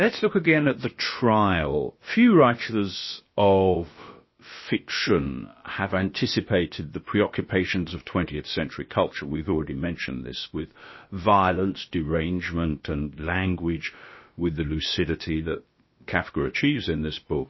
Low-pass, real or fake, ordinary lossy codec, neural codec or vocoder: 7.2 kHz; fake; MP3, 24 kbps; codec, 16 kHz, about 1 kbps, DyCAST, with the encoder's durations